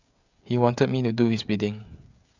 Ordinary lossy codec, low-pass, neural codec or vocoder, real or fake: Opus, 64 kbps; 7.2 kHz; codec, 16 kHz, 16 kbps, FreqCodec, smaller model; fake